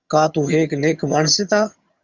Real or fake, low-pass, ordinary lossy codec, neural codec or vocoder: fake; 7.2 kHz; Opus, 64 kbps; vocoder, 22.05 kHz, 80 mel bands, HiFi-GAN